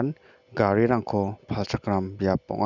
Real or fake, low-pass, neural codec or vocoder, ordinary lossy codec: real; 7.2 kHz; none; none